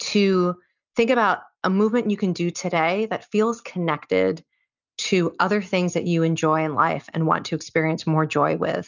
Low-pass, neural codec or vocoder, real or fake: 7.2 kHz; none; real